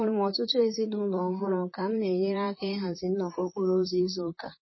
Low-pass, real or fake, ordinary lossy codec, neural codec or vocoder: 7.2 kHz; fake; MP3, 24 kbps; vocoder, 44.1 kHz, 128 mel bands, Pupu-Vocoder